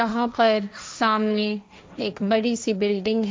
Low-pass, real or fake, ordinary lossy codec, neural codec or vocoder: none; fake; none; codec, 16 kHz, 1.1 kbps, Voila-Tokenizer